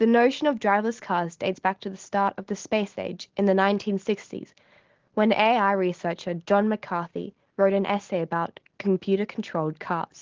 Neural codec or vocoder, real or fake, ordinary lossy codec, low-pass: none; real; Opus, 16 kbps; 7.2 kHz